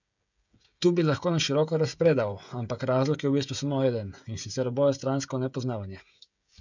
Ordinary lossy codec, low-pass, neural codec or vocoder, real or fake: none; 7.2 kHz; codec, 16 kHz, 16 kbps, FreqCodec, smaller model; fake